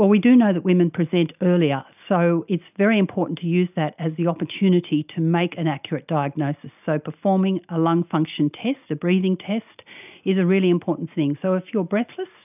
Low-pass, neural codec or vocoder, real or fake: 3.6 kHz; none; real